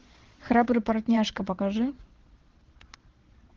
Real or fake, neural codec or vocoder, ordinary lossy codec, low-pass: fake; vocoder, 22.05 kHz, 80 mel bands, Vocos; Opus, 16 kbps; 7.2 kHz